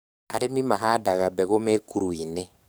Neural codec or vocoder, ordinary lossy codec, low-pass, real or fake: codec, 44.1 kHz, 7.8 kbps, DAC; none; none; fake